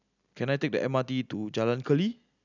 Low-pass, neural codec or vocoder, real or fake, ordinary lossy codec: 7.2 kHz; none; real; none